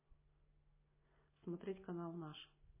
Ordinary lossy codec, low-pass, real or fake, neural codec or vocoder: MP3, 16 kbps; 3.6 kHz; real; none